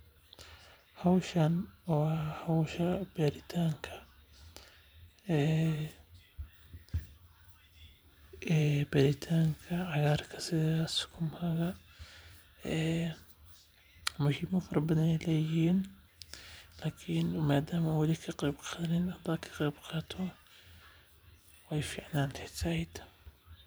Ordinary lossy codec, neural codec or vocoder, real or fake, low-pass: none; vocoder, 44.1 kHz, 128 mel bands every 512 samples, BigVGAN v2; fake; none